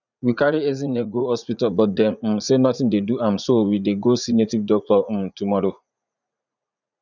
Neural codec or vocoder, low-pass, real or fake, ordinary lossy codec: vocoder, 44.1 kHz, 80 mel bands, Vocos; 7.2 kHz; fake; none